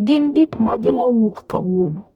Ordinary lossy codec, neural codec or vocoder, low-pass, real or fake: none; codec, 44.1 kHz, 0.9 kbps, DAC; 19.8 kHz; fake